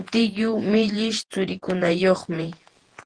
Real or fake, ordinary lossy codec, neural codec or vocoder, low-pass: fake; Opus, 16 kbps; vocoder, 48 kHz, 128 mel bands, Vocos; 9.9 kHz